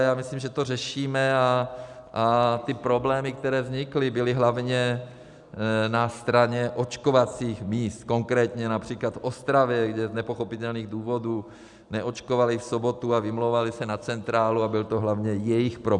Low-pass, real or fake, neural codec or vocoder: 10.8 kHz; real; none